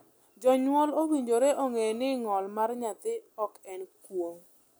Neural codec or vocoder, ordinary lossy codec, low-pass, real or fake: none; none; none; real